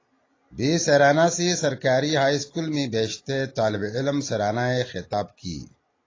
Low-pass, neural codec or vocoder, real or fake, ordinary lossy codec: 7.2 kHz; none; real; AAC, 32 kbps